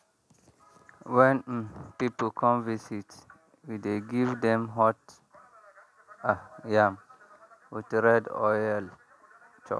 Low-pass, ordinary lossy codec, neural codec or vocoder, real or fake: none; none; none; real